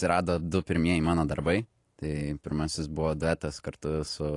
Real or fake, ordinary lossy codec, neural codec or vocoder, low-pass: fake; AAC, 48 kbps; vocoder, 44.1 kHz, 128 mel bands every 512 samples, BigVGAN v2; 10.8 kHz